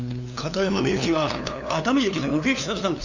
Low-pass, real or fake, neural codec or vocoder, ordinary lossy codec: 7.2 kHz; fake; codec, 16 kHz, 2 kbps, FunCodec, trained on LibriTTS, 25 frames a second; none